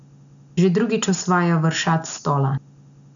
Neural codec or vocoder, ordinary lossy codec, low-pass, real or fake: none; none; 7.2 kHz; real